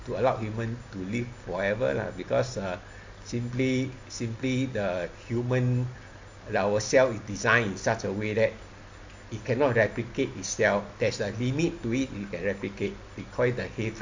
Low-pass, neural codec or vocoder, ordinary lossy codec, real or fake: 7.2 kHz; none; MP3, 48 kbps; real